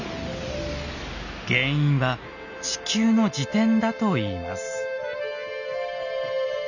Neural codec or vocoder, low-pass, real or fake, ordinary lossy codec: none; 7.2 kHz; real; none